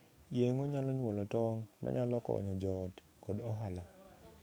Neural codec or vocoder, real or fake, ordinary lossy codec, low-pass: codec, 44.1 kHz, 7.8 kbps, DAC; fake; none; none